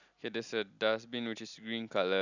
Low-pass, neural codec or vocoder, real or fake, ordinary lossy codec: 7.2 kHz; none; real; none